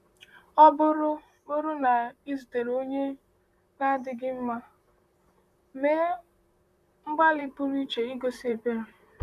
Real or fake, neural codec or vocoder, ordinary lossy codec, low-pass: fake; vocoder, 44.1 kHz, 128 mel bands, Pupu-Vocoder; none; 14.4 kHz